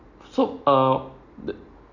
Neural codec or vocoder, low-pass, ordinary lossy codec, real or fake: none; 7.2 kHz; none; real